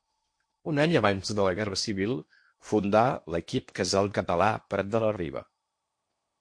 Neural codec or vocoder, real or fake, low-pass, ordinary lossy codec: codec, 16 kHz in and 24 kHz out, 0.8 kbps, FocalCodec, streaming, 65536 codes; fake; 9.9 kHz; MP3, 48 kbps